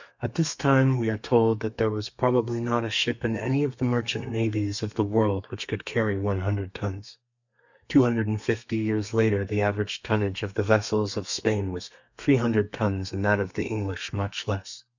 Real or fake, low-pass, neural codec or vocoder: fake; 7.2 kHz; codec, 32 kHz, 1.9 kbps, SNAC